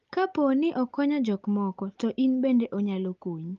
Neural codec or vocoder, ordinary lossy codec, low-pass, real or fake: none; Opus, 32 kbps; 7.2 kHz; real